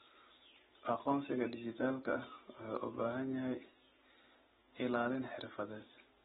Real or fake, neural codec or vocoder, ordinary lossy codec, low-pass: real; none; AAC, 16 kbps; 19.8 kHz